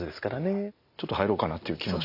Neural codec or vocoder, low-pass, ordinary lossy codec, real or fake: none; 5.4 kHz; none; real